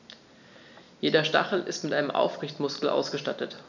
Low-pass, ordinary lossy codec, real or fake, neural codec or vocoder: 7.2 kHz; none; real; none